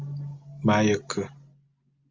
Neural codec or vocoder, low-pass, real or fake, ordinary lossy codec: none; 7.2 kHz; real; Opus, 32 kbps